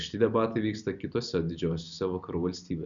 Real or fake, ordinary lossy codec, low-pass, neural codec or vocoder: real; Opus, 64 kbps; 7.2 kHz; none